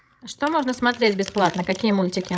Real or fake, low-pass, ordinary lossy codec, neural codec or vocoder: fake; none; none; codec, 16 kHz, 16 kbps, FreqCodec, larger model